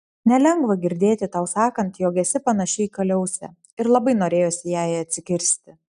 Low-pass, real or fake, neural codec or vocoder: 10.8 kHz; real; none